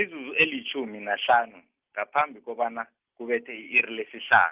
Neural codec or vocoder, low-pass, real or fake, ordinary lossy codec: none; 3.6 kHz; real; Opus, 32 kbps